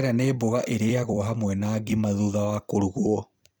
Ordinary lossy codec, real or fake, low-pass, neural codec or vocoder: none; fake; none; vocoder, 44.1 kHz, 128 mel bands every 256 samples, BigVGAN v2